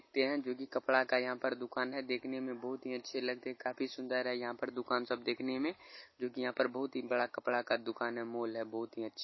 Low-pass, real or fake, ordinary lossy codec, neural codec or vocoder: 7.2 kHz; real; MP3, 24 kbps; none